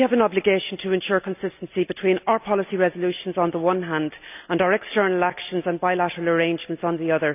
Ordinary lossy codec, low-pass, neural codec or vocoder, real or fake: none; 3.6 kHz; none; real